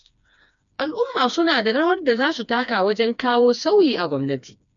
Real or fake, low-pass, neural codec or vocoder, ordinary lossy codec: fake; 7.2 kHz; codec, 16 kHz, 2 kbps, FreqCodec, smaller model; none